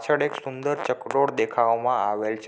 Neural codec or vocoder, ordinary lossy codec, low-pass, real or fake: none; none; none; real